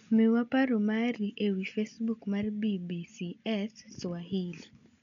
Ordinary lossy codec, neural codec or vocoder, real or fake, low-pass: none; none; real; 7.2 kHz